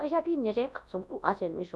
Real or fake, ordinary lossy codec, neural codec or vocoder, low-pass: fake; none; codec, 24 kHz, 0.9 kbps, WavTokenizer, large speech release; none